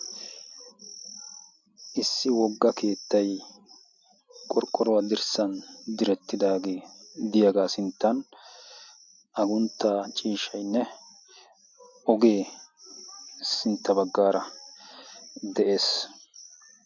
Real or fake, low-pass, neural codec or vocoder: real; 7.2 kHz; none